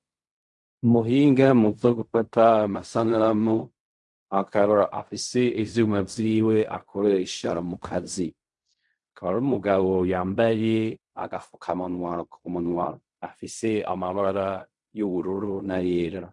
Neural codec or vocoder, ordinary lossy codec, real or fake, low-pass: codec, 16 kHz in and 24 kHz out, 0.4 kbps, LongCat-Audio-Codec, fine tuned four codebook decoder; MP3, 64 kbps; fake; 10.8 kHz